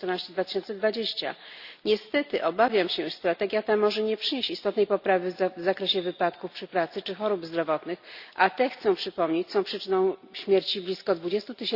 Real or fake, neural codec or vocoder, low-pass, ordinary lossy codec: real; none; 5.4 kHz; Opus, 64 kbps